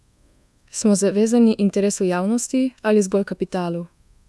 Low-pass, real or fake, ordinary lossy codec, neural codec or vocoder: none; fake; none; codec, 24 kHz, 1.2 kbps, DualCodec